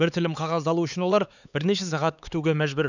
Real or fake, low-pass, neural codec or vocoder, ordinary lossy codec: fake; 7.2 kHz; codec, 16 kHz, 4 kbps, X-Codec, HuBERT features, trained on LibriSpeech; none